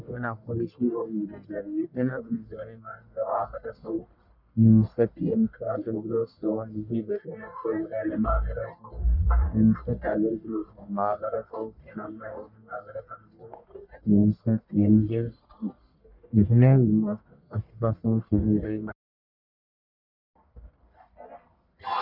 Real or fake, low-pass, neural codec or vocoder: fake; 5.4 kHz; codec, 44.1 kHz, 1.7 kbps, Pupu-Codec